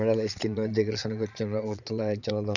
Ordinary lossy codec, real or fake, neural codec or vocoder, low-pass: none; fake; vocoder, 22.05 kHz, 80 mel bands, Vocos; 7.2 kHz